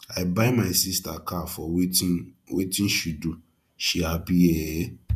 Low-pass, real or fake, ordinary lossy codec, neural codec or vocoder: 14.4 kHz; fake; none; vocoder, 48 kHz, 128 mel bands, Vocos